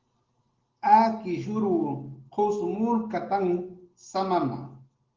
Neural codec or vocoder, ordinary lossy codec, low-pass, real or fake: none; Opus, 16 kbps; 7.2 kHz; real